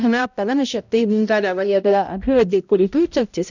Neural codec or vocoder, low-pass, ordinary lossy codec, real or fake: codec, 16 kHz, 0.5 kbps, X-Codec, HuBERT features, trained on balanced general audio; 7.2 kHz; none; fake